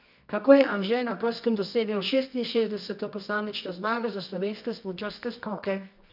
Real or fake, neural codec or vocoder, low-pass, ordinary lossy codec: fake; codec, 24 kHz, 0.9 kbps, WavTokenizer, medium music audio release; 5.4 kHz; none